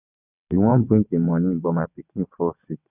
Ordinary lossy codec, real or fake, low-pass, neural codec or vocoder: none; fake; 3.6 kHz; vocoder, 22.05 kHz, 80 mel bands, WaveNeXt